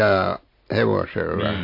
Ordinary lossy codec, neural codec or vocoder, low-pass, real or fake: MP3, 32 kbps; none; 5.4 kHz; real